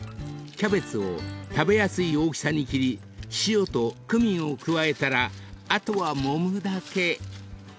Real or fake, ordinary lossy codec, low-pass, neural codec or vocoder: real; none; none; none